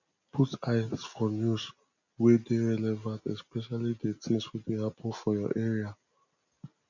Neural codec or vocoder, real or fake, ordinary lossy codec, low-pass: none; real; none; 7.2 kHz